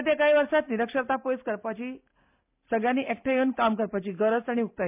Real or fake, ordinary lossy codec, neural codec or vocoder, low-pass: real; MP3, 32 kbps; none; 3.6 kHz